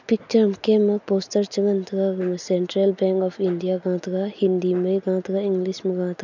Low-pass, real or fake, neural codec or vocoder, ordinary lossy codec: 7.2 kHz; real; none; none